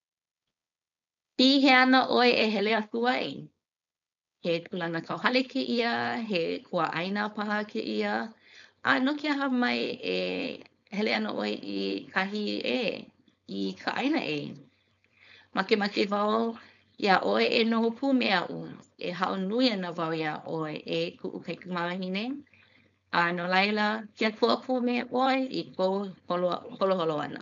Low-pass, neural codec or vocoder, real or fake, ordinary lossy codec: 7.2 kHz; codec, 16 kHz, 4.8 kbps, FACodec; fake; none